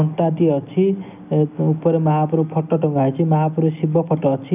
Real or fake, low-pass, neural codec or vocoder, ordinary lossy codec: real; 3.6 kHz; none; none